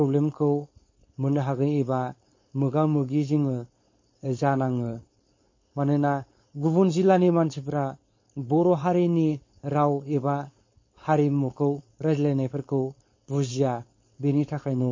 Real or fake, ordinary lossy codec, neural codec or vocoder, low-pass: fake; MP3, 32 kbps; codec, 16 kHz, 4.8 kbps, FACodec; 7.2 kHz